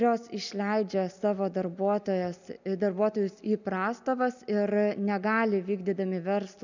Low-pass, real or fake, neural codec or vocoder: 7.2 kHz; real; none